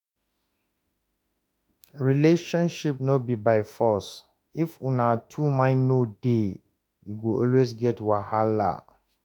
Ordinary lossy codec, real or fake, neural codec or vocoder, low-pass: none; fake; autoencoder, 48 kHz, 32 numbers a frame, DAC-VAE, trained on Japanese speech; 19.8 kHz